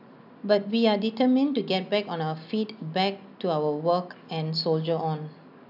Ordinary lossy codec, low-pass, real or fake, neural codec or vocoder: none; 5.4 kHz; real; none